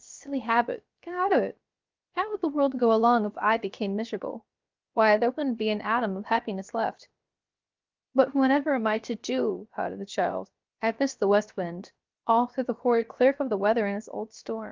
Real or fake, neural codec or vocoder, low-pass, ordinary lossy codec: fake; codec, 16 kHz, 0.7 kbps, FocalCodec; 7.2 kHz; Opus, 32 kbps